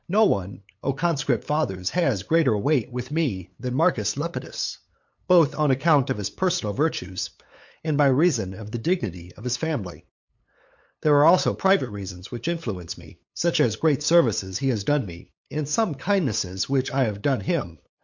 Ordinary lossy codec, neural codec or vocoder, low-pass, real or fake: MP3, 48 kbps; codec, 16 kHz, 8 kbps, FunCodec, trained on LibriTTS, 25 frames a second; 7.2 kHz; fake